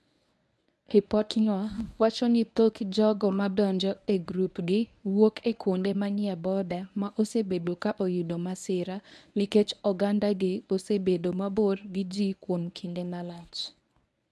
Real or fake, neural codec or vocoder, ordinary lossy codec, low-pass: fake; codec, 24 kHz, 0.9 kbps, WavTokenizer, medium speech release version 1; none; none